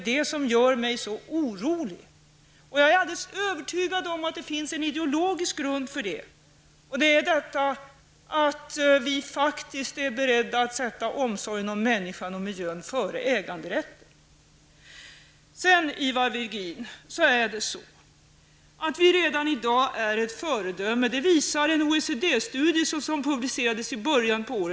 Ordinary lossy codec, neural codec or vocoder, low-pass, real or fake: none; none; none; real